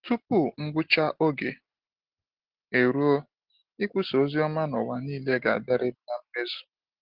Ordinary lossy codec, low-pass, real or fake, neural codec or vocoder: Opus, 16 kbps; 5.4 kHz; real; none